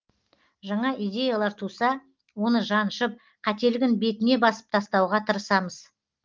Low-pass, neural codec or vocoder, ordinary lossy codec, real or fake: 7.2 kHz; none; Opus, 32 kbps; real